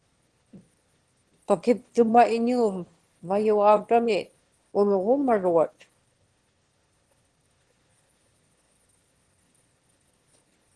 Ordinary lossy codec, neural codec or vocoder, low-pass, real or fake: Opus, 16 kbps; autoencoder, 22.05 kHz, a latent of 192 numbers a frame, VITS, trained on one speaker; 9.9 kHz; fake